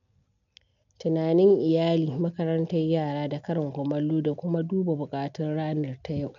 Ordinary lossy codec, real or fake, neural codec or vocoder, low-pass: none; real; none; 7.2 kHz